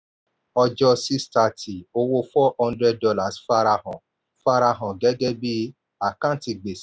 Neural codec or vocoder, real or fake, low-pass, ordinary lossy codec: none; real; none; none